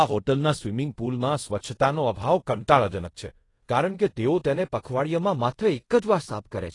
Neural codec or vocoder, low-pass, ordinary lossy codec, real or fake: codec, 24 kHz, 0.5 kbps, DualCodec; 10.8 kHz; AAC, 32 kbps; fake